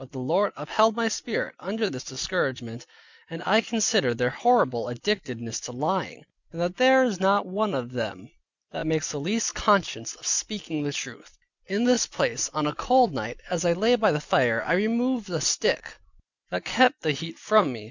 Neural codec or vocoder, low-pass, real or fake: none; 7.2 kHz; real